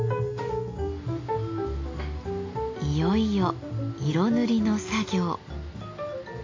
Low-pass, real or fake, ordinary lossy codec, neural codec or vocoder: 7.2 kHz; real; AAC, 32 kbps; none